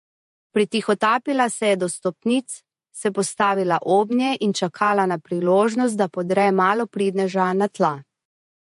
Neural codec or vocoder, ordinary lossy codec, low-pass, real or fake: vocoder, 48 kHz, 128 mel bands, Vocos; MP3, 48 kbps; 14.4 kHz; fake